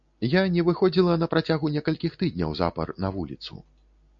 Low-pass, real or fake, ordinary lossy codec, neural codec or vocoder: 7.2 kHz; real; MP3, 48 kbps; none